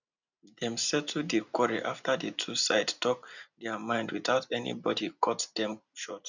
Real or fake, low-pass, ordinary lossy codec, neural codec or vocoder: real; 7.2 kHz; none; none